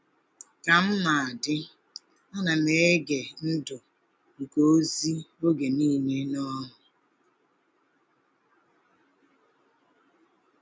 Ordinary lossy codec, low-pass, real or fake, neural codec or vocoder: none; none; real; none